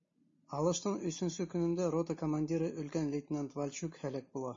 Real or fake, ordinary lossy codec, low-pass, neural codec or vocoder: real; MP3, 32 kbps; 7.2 kHz; none